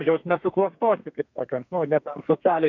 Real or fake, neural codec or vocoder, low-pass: fake; codec, 32 kHz, 1.9 kbps, SNAC; 7.2 kHz